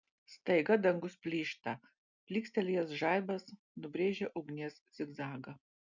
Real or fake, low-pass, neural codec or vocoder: real; 7.2 kHz; none